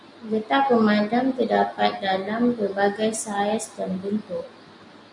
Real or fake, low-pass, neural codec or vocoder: real; 10.8 kHz; none